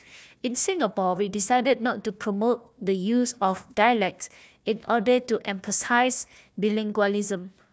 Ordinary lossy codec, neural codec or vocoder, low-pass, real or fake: none; codec, 16 kHz, 1 kbps, FunCodec, trained on Chinese and English, 50 frames a second; none; fake